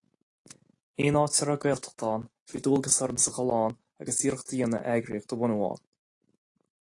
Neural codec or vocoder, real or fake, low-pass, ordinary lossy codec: none; real; 10.8 kHz; AAC, 48 kbps